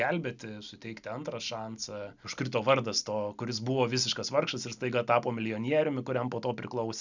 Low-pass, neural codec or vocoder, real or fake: 7.2 kHz; none; real